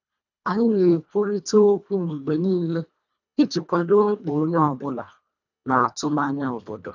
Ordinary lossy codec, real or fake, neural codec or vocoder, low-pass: none; fake; codec, 24 kHz, 1.5 kbps, HILCodec; 7.2 kHz